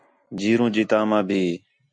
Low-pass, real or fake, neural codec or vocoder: 9.9 kHz; real; none